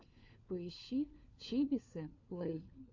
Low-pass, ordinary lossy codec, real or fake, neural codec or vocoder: 7.2 kHz; AAC, 48 kbps; fake; codec, 16 kHz, 4 kbps, FunCodec, trained on LibriTTS, 50 frames a second